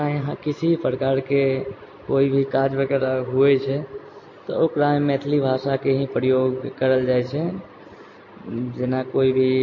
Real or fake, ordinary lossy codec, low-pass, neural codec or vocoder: real; MP3, 32 kbps; 7.2 kHz; none